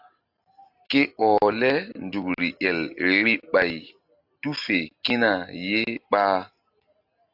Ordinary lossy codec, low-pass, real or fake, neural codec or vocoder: AAC, 48 kbps; 5.4 kHz; real; none